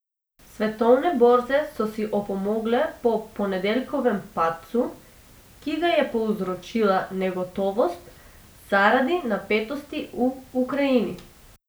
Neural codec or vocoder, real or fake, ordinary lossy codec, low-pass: none; real; none; none